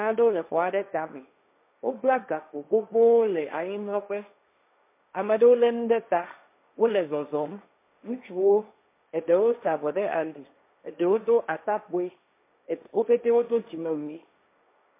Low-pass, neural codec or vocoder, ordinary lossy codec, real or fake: 3.6 kHz; codec, 16 kHz, 1.1 kbps, Voila-Tokenizer; MP3, 24 kbps; fake